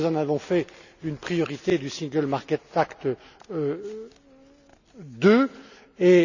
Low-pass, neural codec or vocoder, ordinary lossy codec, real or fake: 7.2 kHz; none; none; real